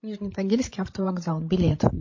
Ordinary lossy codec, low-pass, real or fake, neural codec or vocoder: MP3, 32 kbps; 7.2 kHz; fake; codec, 16 kHz, 16 kbps, FreqCodec, larger model